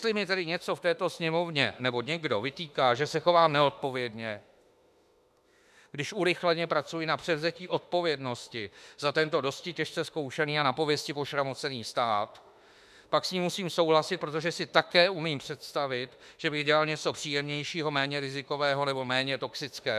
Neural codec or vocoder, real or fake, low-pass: autoencoder, 48 kHz, 32 numbers a frame, DAC-VAE, trained on Japanese speech; fake; 14.4 kHz